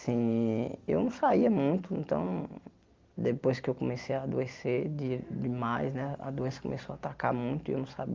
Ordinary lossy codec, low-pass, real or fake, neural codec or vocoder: Opus, 32 kbps; 7.2 kHz; real; none